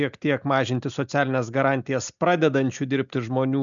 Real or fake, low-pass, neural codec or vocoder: real; 7.2 kHz; none